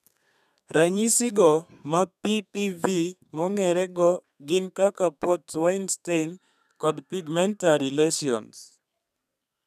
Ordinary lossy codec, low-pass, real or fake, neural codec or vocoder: none; 14.4 kHz; fake; codec, 32 kHz, 1.9 kbps, SNAC